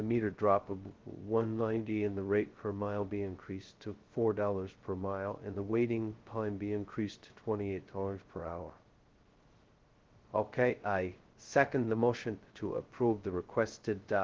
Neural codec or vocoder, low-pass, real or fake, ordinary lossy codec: codec, 16 kHz, 0.2 kbps, FocalCodec; 7.2 kHz; fake; Opus, 16 kbps